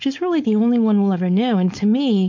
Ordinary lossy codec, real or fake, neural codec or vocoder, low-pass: MP3, 48 kbps; fake; codec, 16 kHz, 4.8 kbps, FACodec; 7.2 kHz